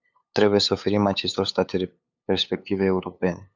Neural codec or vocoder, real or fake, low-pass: codec, 16 kHz, 8 kbps, FunCodec, trained on LibriTTS, 25 frames a second; fake; 7.2 kHz